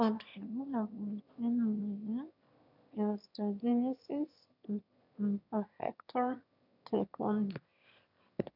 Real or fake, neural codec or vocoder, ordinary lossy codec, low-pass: fake; autoencoder, 22.05 kHz, a latent of 192 numbers a frame, VITS, trained on one speaker; none; 5.4 kHz